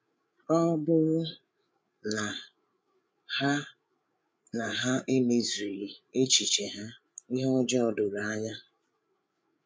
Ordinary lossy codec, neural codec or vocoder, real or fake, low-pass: none; codec, 16 kHz, 8 kbps, FreqCodec, larger model; fake; none